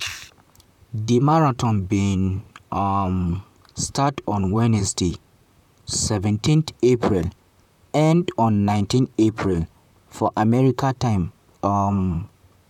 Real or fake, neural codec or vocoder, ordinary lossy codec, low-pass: fake; vocoder, 44.1 kHz, 128 mel bands, Pupu-Vocoder; none; 19.8 kHz